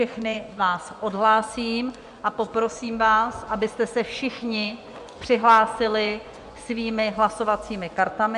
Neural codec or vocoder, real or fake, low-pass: vocoder, 24 kHz, 100 mel bands, Vocos; fake; 10.8 kHz